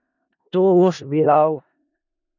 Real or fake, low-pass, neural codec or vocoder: fake; 7.2 kHz; codec, 16 kHz in and 24 kHz out, 0.4 kbps, LongCat-Audio-Codec, four codebook decoder